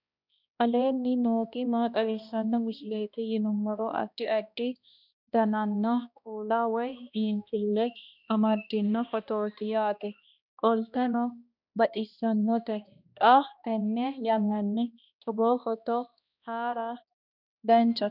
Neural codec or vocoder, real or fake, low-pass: codec, 16 kHz, 1 kbps, X-Codec, HuBERT features, trained on balanced general audio; fake; 5.4 kHz